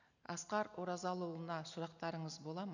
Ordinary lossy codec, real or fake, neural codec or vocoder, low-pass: none; real; none; 7.2 kHz